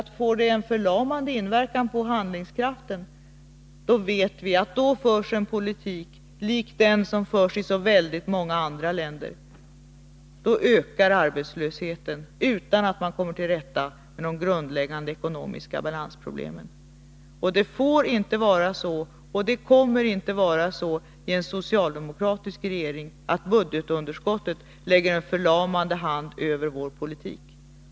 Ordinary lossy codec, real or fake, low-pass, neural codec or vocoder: none; real; none; none